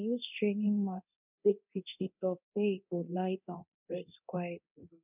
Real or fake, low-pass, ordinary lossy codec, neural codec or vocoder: fake; 3.6 kHz; none; codec, 24 kHz, 0.9 kbps, DualCodec